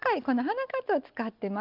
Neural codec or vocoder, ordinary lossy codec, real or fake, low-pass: none; Opus, 24 kbps; real; 5.4 kHz